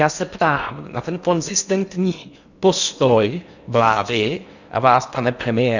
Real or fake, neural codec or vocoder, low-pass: fake; codec, 16 kHz in and 24 kHz out, 0.8 kbps, FocalCodec, streaming, 65536 codes; 7.2 kHz